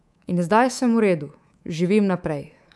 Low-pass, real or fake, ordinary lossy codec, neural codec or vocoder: none; fake; none; codec, 24 kHz, 3.1 kbps, DualCodec